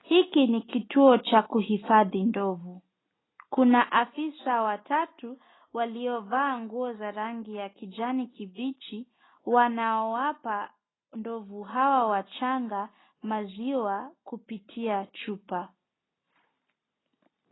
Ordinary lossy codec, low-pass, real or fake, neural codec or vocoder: AAC, 16 kbps; 7.2 kHz; real; none